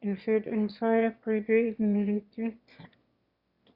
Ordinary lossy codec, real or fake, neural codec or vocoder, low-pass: Opus, 64 kbps; fake; autoencoder, 22.05 kHz, a latent of 192 numbers a frame, VITS, trained on one speaker; 5.4 kHz